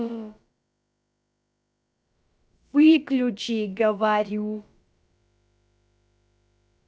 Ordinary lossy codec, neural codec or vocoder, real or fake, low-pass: none; codec, 16 kHz, about 1 kbps, DyCAST, with the encoder's durations; fake; none